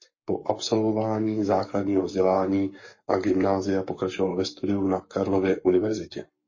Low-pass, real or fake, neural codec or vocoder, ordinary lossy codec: 7.2 kHz; fake; codec, 44.1 kHz, 7.8 kbps, Pupu-Codec; MP3, 32 kbps